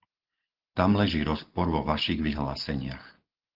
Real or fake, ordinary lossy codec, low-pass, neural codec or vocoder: real; Opus, 16 kbps; 5.4 kHz; none